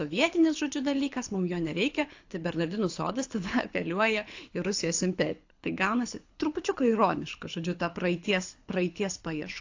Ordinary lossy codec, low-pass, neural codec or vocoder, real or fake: AAC, 48 kbps; 7.2 kHz; codec, 24 kHz, 6 kbps, HILCodec; fake